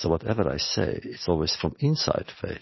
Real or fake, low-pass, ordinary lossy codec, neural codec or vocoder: real; 7.2 kHz; MP3, 24 kbps; none